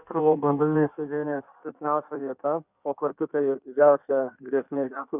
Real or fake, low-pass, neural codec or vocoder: fake; 3.6 kHz; codec, 16 kHz in and 24 kHz out, 1.1 kbps, FireRedTTS-2 codec